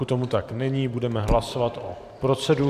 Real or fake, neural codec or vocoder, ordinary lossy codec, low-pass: fake; vocoder, 44.1 kHz, 128 mel bands every 512 samples, BigVGAN v2; MP3, 96 kbps; 14.4 kHz